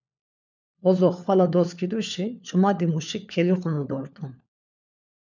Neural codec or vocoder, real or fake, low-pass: codec, 16 kHz, 4 kbps, FunCodec, trained on LibriTTS, 50 frames a second; fake; 7.2 kHz